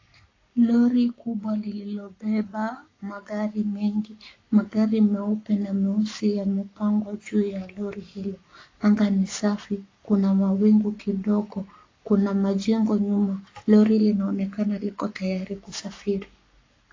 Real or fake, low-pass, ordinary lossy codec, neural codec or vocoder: fake; 7.2 kHz; AAC, 32 kbps; codec, 44.1 kHz, 7.8 kbps, Pupu-Codec